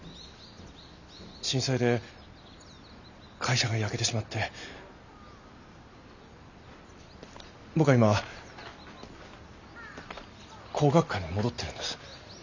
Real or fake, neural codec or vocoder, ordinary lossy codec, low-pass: real; none; none; 7.2 kHz